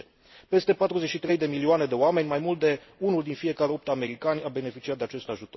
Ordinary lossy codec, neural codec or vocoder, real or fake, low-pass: MP3, 24 kbps; none; real; 7.2 kHz